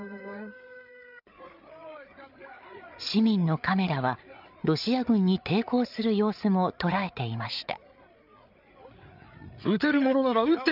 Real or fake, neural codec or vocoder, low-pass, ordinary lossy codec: fake; codec, 16 kHz, 8 kbps, FreqCodec, larger model; 5.4 kHz; none